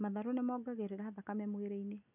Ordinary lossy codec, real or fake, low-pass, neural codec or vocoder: none; real; 3.6 kHz; none